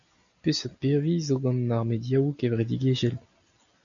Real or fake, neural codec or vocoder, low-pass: real; none; 7.2 kHz